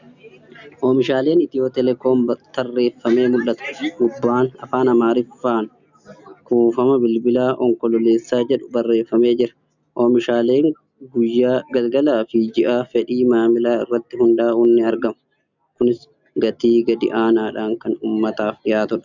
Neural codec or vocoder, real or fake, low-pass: none; real; 7.2 kHz